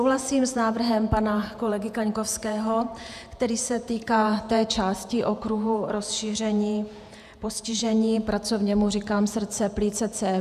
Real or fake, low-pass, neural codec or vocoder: fake; 14.4 kHz; vocoder, 48 kHz, 128 mel bands, Vocos